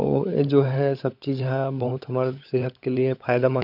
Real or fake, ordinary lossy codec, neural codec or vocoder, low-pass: fake; none; codec, 16 kHz, 16 kbps, FunCodec, trained on LibriTTS, 50 frames a second; 5.4 kHz